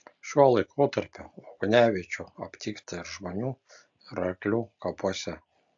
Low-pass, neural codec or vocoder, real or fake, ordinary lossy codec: 7.2 kHz; none; real; AAC, 64 kbps